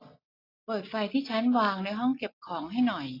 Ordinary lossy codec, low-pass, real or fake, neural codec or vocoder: AAC, 24 kbps; 5.4 kHz; real; none